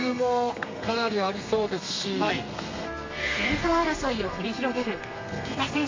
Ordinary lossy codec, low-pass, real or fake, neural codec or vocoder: MP3, 48 kbps; 7.2 kHz; fake; codec, 32 kHz, 1.9 kbps, SNAC